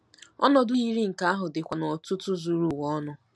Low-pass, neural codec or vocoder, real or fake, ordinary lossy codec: none; none; real; none